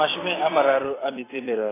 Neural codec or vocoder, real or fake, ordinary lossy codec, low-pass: vocoder, 44.1 kHz, 128 mel bands every 256 samples, BigVGAN v2; fake; AAC, 16 kbps; 3.6 kHz